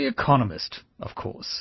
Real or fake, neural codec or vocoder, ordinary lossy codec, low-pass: real; none; MP3, 24 kbps; 7.2 kHz